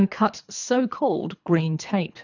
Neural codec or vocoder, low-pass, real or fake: codec, 24 kHz, 3 kbps, HILCodec; 7.2 kHz; fake